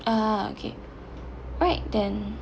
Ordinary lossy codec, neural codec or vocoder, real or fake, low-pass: none; none; real; none